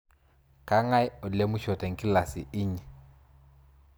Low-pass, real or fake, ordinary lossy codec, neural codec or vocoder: none; real; none; none